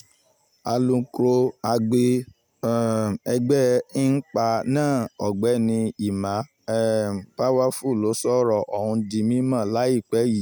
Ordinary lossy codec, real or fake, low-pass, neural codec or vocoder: none; real; none; none